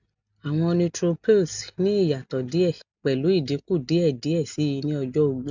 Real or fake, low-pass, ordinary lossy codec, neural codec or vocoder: real; 7.2 kHz; none; none